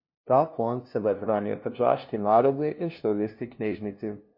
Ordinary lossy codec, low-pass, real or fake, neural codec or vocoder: MP3, 32 kbps; 5.4 kHz; fake; codec, 16 kHz, 0.5 kbps, FunCodec, trained on LibriTTS, 25 frames a second